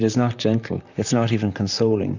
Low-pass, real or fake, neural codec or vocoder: 7.2 kHz; fake; vocoder, 44.1 kHz, 128 mel bands, Pupu-Vocoder